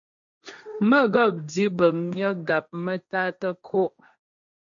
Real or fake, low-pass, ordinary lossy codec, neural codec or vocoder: fake; 7.2 kHz; MP3, 64 kbps; codec, 16 kHz, 1.1 kbps, Voila-Tokenizer